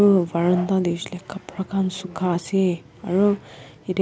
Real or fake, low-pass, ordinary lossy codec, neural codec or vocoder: real; none; none; none